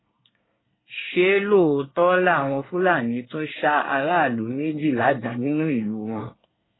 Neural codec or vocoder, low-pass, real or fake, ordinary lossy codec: codec, 24 kHz, 1 kbps, SNAC; 7.2 kHz; fake; AAC, 16 kbps